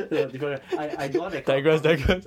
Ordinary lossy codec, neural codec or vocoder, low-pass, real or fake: MP3, 96 kbps; vocoder, 44.1 kHz, 128 mel bands every 512 samples, BigVGAN v2; 19.8 kHz; fake